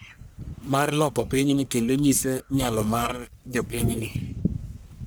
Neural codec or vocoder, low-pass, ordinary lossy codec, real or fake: codec, 44.1 kHz, 1.7 kbps, Pupu-Codec; none; none; fake